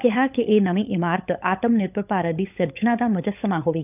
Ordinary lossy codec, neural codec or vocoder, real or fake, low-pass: none; codec, 16 kHz, 16 kbps, FunCodec, trained on LibriTTS, 50 frames a second; fake; 3.6 kHz